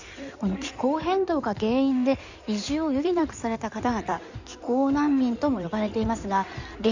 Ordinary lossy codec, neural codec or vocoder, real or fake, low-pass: none; codec, 16 kHz in and 24 kHz out, 2.2 kbps, FireRedTTS-2 codec; fake; 7.2 kHz